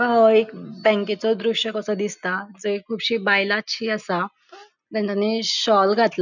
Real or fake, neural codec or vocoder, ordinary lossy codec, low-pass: real; none; none; 7.2 kHz